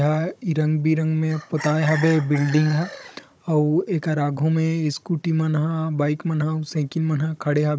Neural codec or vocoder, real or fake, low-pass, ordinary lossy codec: codec, 16 kHz, 16 kbps, FunCodec, trained on Chinese and English, 50 frames a second; fake; none; none